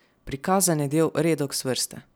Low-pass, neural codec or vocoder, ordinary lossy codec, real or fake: none; none; none; real